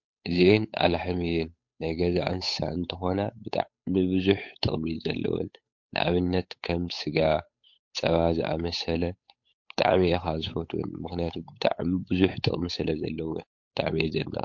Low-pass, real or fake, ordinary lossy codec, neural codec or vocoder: 7.2 kHz; fake; MP3, 48 kbps; codec, 16 kHz, 8 kbps, FunCodec, trained on Chinese and English, 25 frames a second